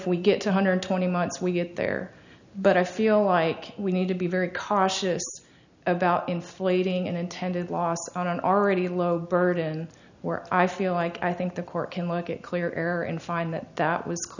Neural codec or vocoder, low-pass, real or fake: none; 7.2 kHz; real